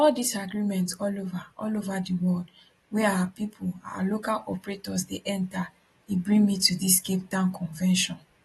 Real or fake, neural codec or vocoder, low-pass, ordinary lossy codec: real; none; 19.8 kHz; AAC, 32 kbps